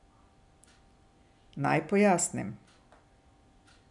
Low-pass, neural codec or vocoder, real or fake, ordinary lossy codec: 10.8 kHz; none; real; none